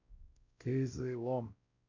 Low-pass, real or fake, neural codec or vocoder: 7.2 kHz; fake; codec, 16 kHz, 0.5 kbps, X-Codec, WavLM features, trained on Multilingual LibriSpeech